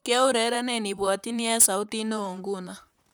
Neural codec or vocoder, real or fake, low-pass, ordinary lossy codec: vocoder, 44.1 kHz, 128 mel bands, Pupu-Vocoder; fake; none; none